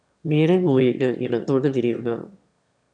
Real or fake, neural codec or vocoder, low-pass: fake; autoencoder, 22.05 kHz, a latent of 192 numbers a frame, VITS, trained on one speaker; 9.9 kHz